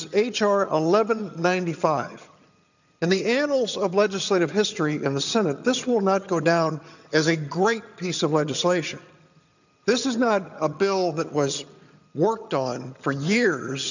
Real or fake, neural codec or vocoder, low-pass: fake; vocoder, 22.05 kHz, 80 mel bands, HiFi-GAN; 7.2 kHz